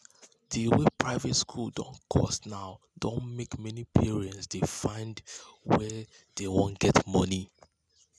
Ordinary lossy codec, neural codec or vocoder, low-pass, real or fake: none; none; none; real